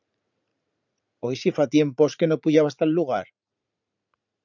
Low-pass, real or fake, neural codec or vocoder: 7.2 kHz; fake; vocoder, 44.1 kHz, 80 mel bands, Vocos